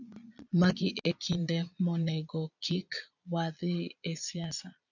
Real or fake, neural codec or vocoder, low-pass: fake; vocoder, 22.05 kHz, 80 mel bands, Vocos; 7.2 kHz